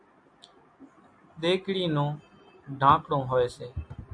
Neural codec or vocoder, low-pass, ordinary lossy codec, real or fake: none; 9.9 kHz; AAC, 64 kbps; real